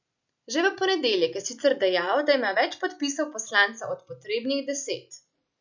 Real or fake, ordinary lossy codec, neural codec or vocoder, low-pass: real; none; none; 7.2 kHz